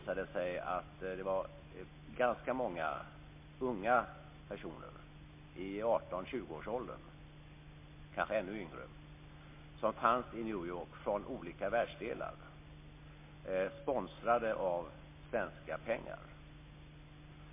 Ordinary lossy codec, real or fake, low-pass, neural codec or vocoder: MP3, 24 kbps; real; 3.6 kHz; none